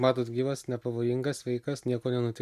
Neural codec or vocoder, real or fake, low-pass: none; real; 14.4 kHz